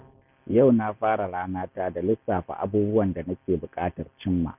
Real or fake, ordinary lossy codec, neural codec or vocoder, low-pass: real; none; none; 3.6 kHz